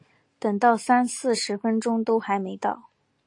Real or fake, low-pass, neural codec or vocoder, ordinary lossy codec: real; 10.8 kHz; none; AAC, 48 kbps